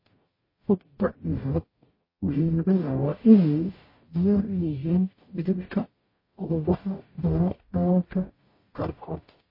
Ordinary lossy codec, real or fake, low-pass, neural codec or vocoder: MP3, 24 kbps; fake; 5.4 kHz; codec, 44.1 kHz, 0.9 kbps, DAC